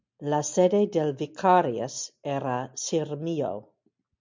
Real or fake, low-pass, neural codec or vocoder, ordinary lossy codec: real; 7.2 kHz; none; MP3, 64 kbps